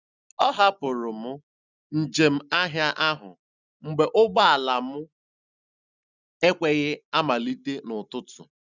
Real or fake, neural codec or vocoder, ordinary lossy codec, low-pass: real; none; none; 7.2 kHz